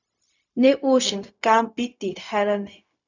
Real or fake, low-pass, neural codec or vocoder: fake; 7.2 kHz; codec, 16 kHz, 0.4 kbps, LongCat-Audio-Codec